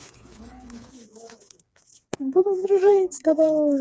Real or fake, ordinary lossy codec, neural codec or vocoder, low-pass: fake; none; codec, 16 kHz, 4 kbps, FreqCodec, smaller model; none